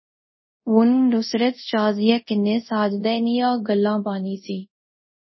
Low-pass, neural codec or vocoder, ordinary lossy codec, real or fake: 7.2 kHz; codec, 24 kHz, 0.5 kbps, DualCodec; MP3, 24 kbps; fake